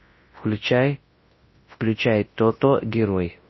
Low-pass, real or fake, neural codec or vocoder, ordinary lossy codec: 7.2 kHz; fake; codec, 24 kHz, 0.9 kbps, WavTokenizer, large speech release; MP3, 24 kbps